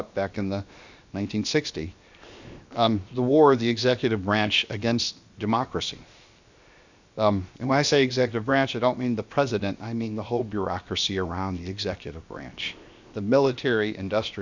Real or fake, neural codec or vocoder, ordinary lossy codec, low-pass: fake; codec, 16 kHz, 0.7 kbps, FocalCodec; Opus, 64 kbps; 7.2 kHz